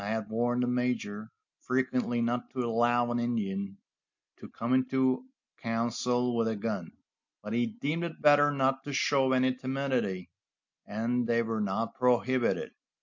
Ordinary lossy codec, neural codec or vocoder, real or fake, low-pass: MP3, 48 kbps; none; real; 7.2 kHz